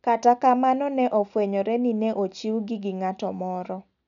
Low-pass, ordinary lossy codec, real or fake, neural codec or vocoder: 7.2 kHz; none; real; none